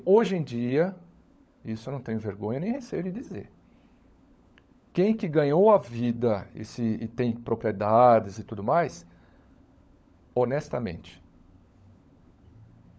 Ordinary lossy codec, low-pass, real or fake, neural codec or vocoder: none; none; fake; codec, 16 kHz, 16 kbps, FunCodec, trained on LibriTTS, 50 frames a second